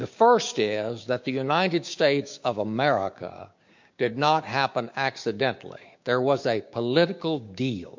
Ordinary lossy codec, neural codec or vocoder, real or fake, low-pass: MP3, 48 kbps; codec, 16 kHz, 6 kbps, DAC; fake; 7.2 kHz